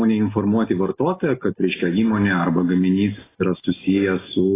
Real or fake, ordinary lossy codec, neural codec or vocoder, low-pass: real; AAC, 16 kbps; none; 3.6 kHz